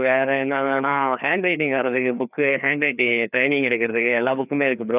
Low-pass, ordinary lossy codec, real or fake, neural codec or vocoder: 3.6 kHz; none; fake; codec, 16 kHz, 2 kbps, FreqCodec, larger model